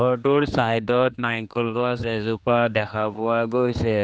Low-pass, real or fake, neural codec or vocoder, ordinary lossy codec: none; fake; codec, 16 kHz, 2 kbps, X-Codec, HuBERT features, trained on general audio; none